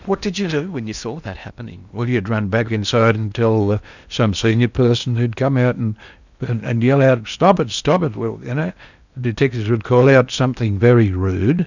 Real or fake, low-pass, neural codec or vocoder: fake; 7.2 kHz; codec, 16 kHz in and 24 kHz out, 0.8 kbps, FocalCodec, streaming, 65536 codes